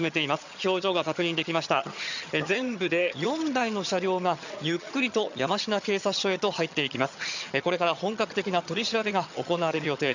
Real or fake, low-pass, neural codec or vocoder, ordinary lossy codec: fake; 7.2 kHz; vocoder, 22.05 kHz, 80 mel bands, HiFi-GAN; none